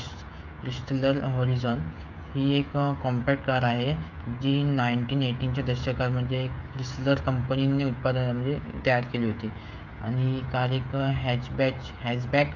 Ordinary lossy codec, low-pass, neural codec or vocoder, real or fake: none; 7.2 kHz; codec, 16 kHz, 16 kbps, FreqCodec, smaller model; fake